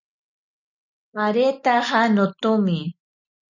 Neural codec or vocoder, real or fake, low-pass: none; real; 7.2 kHz